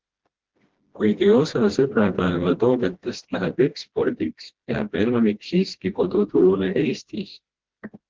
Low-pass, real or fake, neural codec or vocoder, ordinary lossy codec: 7.2 kHz; fake; codec, 16 kHz, 1 kbps, FreqCodec, smaller model; Opus, 16 kbps